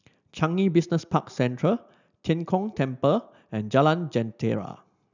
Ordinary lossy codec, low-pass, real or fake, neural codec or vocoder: none; 7.2 kHz; real; none